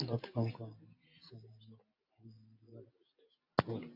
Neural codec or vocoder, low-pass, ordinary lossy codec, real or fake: none; 5.4 kHz; AAC, 48 kbps; real